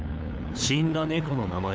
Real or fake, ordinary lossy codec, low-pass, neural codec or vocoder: fake; none; none; codec, 16 kHz, 8 kbps, FunCodec, trained on LibriTTS, 25 frames a second